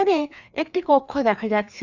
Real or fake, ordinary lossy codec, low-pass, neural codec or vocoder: fake; none; 7.2 kHz; codec, 16 kHz, 2 kbps, FreqCodec, larger model